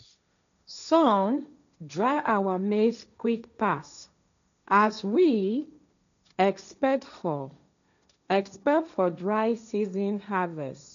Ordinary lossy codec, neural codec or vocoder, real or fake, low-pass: MP3, 96 kbps; codec, 16 kHz, 1.1 kbps, Voila-Tokenizer; fake; 7.2 kHz